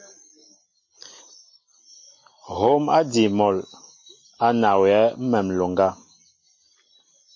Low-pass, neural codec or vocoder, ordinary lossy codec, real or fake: 7.2 kHz; none; MP3, 32 kbps; real